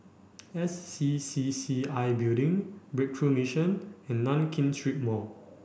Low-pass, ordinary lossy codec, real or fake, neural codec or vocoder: none; none; real; none